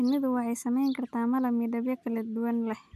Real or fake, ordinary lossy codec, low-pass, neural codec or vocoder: real; none; 14.4 kHz; none